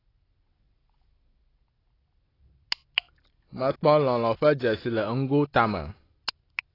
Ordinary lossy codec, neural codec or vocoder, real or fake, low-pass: AAC, 24 kbps; none; real; 5.4 kHz